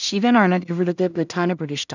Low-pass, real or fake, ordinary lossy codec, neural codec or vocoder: 7.2 kHz; fake; none; codec, 16 kHz in and 24 kHz out, 0.4 kbps, LongCat-Audio-Codec, two codebook decoder